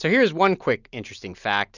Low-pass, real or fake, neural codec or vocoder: 7.2 kHz; real; none